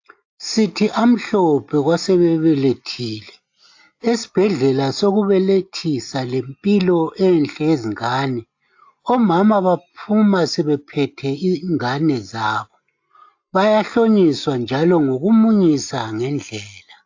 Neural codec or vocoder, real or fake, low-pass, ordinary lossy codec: none; real; 7.2 kHz; AAC, 48 kbps